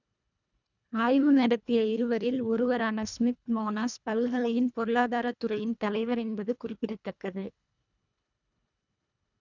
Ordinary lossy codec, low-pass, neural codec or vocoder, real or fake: none; 7.2 kHz; codec, 24 kHz, 1.5 kbps, HILCodec; fake